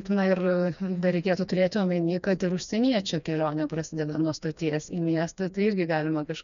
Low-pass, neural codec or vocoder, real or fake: 7.2 kHz; codec, 16 kHz, 2 kbps, FreqCodec, smaller model; fake